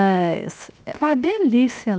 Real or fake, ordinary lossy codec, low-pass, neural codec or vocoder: fake; none; none; codec, 16 kHz, 0.7 kbps, FocalCodec